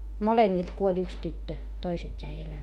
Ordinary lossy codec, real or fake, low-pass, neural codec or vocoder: MP3, 64 kbps; fake; 19.8 kHz; autoencoder, 48 kHz, 32 numbers a frame, DAC-VAE, trained on Japanese speech